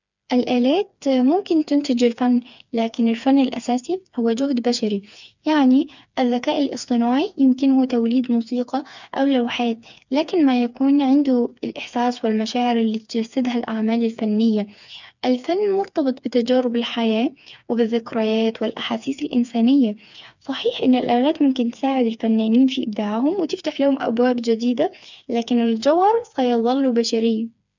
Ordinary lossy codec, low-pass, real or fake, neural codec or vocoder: none; 7.2 kHz; fake; codec, 16 kHz, 4 kbps, FreqCodec, smaller model